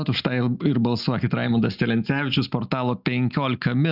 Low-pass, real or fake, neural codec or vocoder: 5.4 kHz; real; none